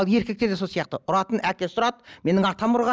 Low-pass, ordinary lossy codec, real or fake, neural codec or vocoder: none; none; real; none